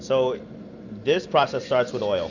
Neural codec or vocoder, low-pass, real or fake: none; 7.2 kHz; real